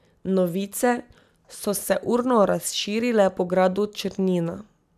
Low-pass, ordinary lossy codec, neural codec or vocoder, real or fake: 14.4 kHz; none; none; real